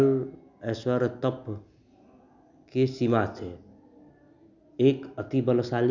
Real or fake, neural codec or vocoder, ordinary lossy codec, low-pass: real; none; none; 7.2 kHz